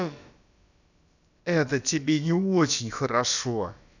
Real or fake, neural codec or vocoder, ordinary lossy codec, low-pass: fake; codec, 16 kHz, about 1 kbps, DyCAST, with the encoder's durations; none; 7.2 kHz